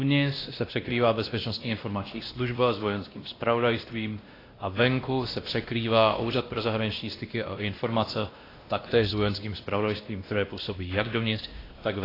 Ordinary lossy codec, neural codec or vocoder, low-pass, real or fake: AAC, 24 kbps; codec, 16 kHz, 1 kbps, X-Codec, WavLM features, trained on Multilingual LibriSpeech; 5.4 kHz; fake